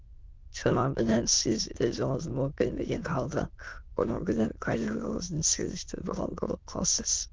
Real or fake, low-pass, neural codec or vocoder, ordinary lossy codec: fake; 7.2 kHz; autoencoder, 22.05 kHz, a latent of 192 numbers a frame, VITS, trained on many speakers; Opus, 32 kbps